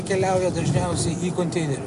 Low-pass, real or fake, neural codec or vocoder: 10.8 kHz; real; none